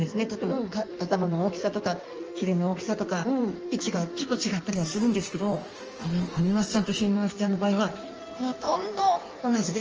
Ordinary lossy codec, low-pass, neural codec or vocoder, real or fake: Opus, 24 kbps; 7.2 kHz; codec, 16 kHz in and 24 kHz out, 1.1 kbps, FireRedTTS-2 codec; fake